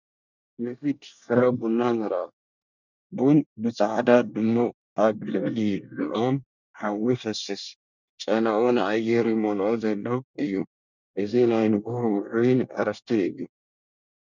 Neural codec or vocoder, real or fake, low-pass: codec, 24 kHz, 1 kbps, SNAC; fake; 7.2 kHz